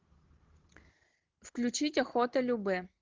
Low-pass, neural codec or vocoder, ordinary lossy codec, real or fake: 7.2 kHz; none; Opus, 16 kbps; real